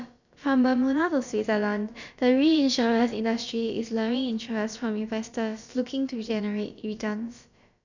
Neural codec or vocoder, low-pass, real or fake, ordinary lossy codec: codec, 16 kHz, about 1 kbps, DyCAST, with the encoder's durations; 7.2 kHz; fake; none